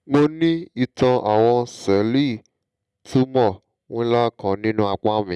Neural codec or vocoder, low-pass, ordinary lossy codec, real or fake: none; none; none; real